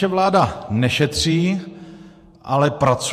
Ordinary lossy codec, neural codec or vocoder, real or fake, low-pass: MP3, 64 kbps; vocoder, 48 kHz, 128 mel bands, Vocos; fake; 14.4 kHz